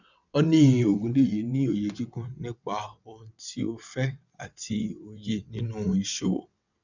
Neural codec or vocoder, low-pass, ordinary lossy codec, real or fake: vocoder, 22.05 kHz, 80 mel bands, WaveNeXt; 7.2 kHz; none; fake